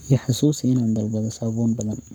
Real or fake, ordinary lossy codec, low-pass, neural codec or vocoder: fake; none; none; codec, 44.1 kHz, 7.8 kbps, Pupu-Codec